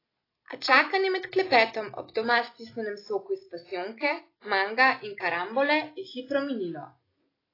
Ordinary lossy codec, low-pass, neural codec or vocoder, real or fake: AAC, 24 kbps; 5.4 kHz; none; real